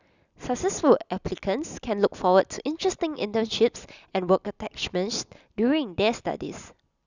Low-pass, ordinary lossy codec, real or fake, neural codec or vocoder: 7.2 kHz; none; real; none